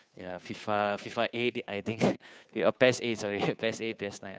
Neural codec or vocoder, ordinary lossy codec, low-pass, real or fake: codec, 16 kHz, 2 kbps, FunCodec, trained on Chinese and English, 25 frames a second; none; none; fake